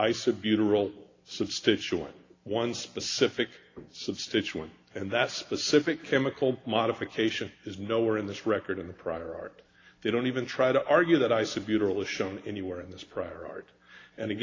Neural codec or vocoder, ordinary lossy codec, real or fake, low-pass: none; AAC, 32 kbps; real; 7.2 kHz